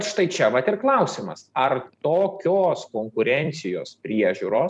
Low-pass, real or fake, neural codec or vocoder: 10.8 kHz; fake; vocoder, 44.1 kHz, 128 mel bands every 256 samples, BigVGAN v2